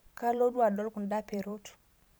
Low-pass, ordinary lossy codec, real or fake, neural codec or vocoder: none; none; fake; vocoder, 44.1 kHz, 128 mel bands every 512 samples, BigVGAN v2